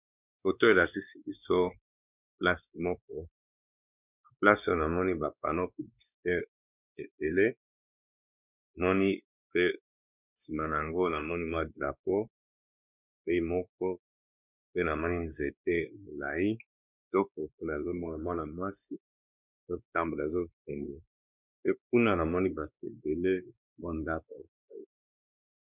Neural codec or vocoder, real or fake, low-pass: codec, 16 kHz, 2 kbps, X-Codec, WavLM features, trained on Multilingual LibriSpeech; fake; 3.6 kHz